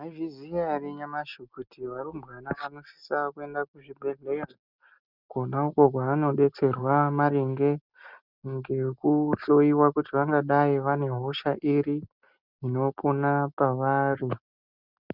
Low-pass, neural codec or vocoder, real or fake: 5.4 kHz; none; real